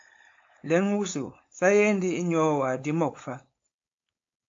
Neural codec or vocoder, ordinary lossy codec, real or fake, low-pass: codec, 16 kHz, 4.8 kbps, FACodec; AAC, 48 kbps; fake; 7.2 kHz